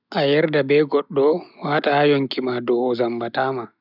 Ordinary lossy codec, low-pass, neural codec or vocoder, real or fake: none; 5.4 kHz; none; real